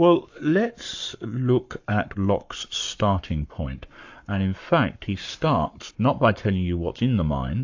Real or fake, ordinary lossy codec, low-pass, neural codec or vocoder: fake; AAC, 48 kbps; 7.2 kHz; codec, 44.1 kHz, 7.8 kbps, Pupu-Codec